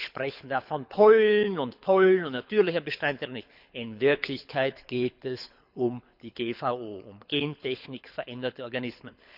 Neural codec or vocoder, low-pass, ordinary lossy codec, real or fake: codec, 24 kHz, 6 kbps, HILCodec; 5.4 kHz; none; fake